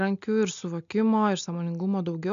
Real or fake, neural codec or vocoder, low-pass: real; none; 7.2 kHz